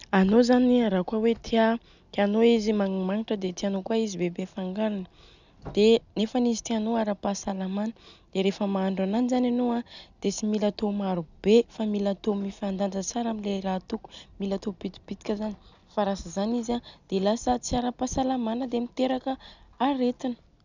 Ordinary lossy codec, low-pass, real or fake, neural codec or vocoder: none; 7.2 kHz; real; none